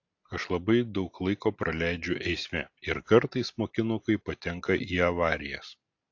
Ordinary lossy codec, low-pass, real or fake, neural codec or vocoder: AAC, 48 kbps; 7.2 kHz; real; none